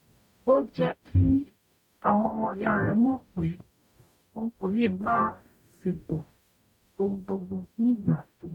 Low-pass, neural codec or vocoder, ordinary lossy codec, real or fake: 19.8 kHz; codec, 44.1 kHz, 0.9 kbps, DAC; none; fake